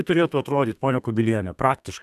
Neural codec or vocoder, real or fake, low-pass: codec, 32 kHz, 1.9 kbps, SNAC; fake; 14.4 kHz